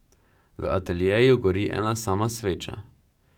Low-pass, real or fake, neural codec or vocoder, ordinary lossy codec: 19.8 kHz; fake; codec, 44.1 kHz, 7.8 kbps, DAC; none